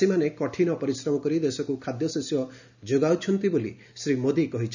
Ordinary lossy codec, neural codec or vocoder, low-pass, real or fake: none; none; 7.2 kHz; real